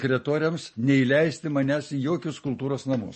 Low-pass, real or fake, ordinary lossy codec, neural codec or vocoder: 10.8 kHz; real; MP3, 32 kbps; none